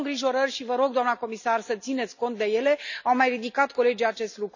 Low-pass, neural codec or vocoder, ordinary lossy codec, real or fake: 7.2 kHz; none; none; real